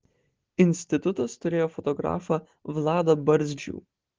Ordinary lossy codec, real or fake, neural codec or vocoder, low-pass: Opus, 16 kbps; real; none; 7.2 kHz